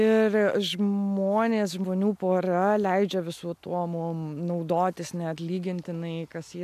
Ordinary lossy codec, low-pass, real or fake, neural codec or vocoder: MP3, 96 kbps; 14.4 kHz; real; none